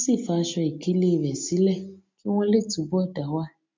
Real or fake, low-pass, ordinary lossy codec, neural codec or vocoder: real; 7.2 kHz; MP3, 48 kbps; none